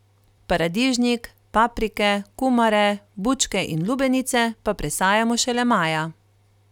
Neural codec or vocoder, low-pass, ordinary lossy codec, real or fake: none; 19.8 kHz; none; real